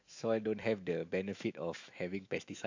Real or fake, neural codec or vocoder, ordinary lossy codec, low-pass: fake; autoencoder, 48 kHz, 128 numbers a frame, DAC-VAE, trained on Japanese speech; AAC, 48 kbps; 7.2 kHz